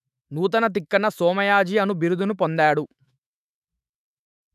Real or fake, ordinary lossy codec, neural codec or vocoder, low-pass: fake; none; autoencoder, 48 kHz, 128 numbers a frame, DAC-VAE, trained on Japanese speech; 14.4 kHz